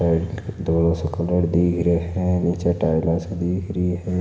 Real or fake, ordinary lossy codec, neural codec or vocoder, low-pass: real; none; none; none